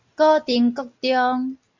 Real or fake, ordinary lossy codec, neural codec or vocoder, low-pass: real; MP3, 64 kbps; none; 7.2 kHz